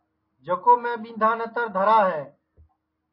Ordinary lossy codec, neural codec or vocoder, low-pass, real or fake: MP3, 24 kbps; none; 5.4 kHz; real